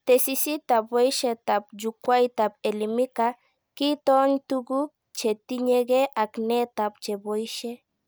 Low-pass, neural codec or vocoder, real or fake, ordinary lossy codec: none; none; real; none